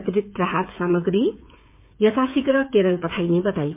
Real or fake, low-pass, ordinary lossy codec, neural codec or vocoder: fake; 3.6 kHz; MP3, 32 kbps; codec, 16 kHz, 8 kbps, FreqCodec, smaller model